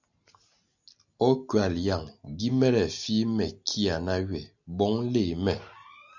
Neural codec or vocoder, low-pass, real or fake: none; 7.2 kHz; real